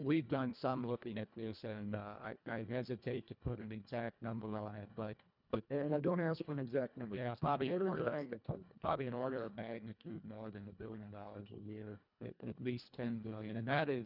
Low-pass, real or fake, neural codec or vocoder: 5.4 kHz; fake; codec, 24 kHz, 1.5 kbps, HILCodec